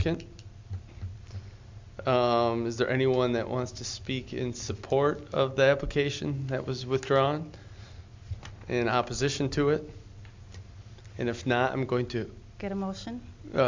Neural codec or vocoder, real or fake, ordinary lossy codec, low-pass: none; real; MP3, 64 kbps; 7.2 kHz